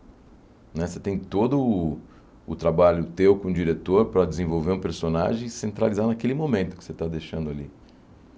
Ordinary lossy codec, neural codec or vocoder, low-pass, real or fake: none; none; none; real